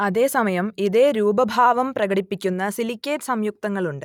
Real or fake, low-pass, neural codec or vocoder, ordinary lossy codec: real; 19.8 kHz; none; none